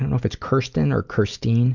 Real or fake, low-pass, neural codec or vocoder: real; 7.2 kHz; none